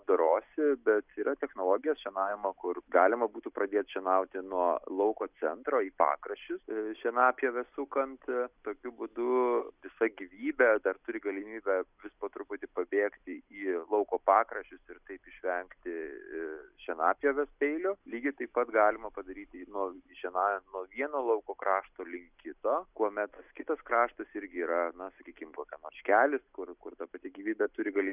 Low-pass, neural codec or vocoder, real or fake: 3.6 kHz; none; real